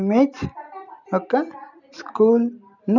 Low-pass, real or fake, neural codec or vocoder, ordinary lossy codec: 7.2 kHz; real; none; none